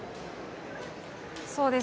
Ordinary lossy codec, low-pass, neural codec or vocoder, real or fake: none; none; none; real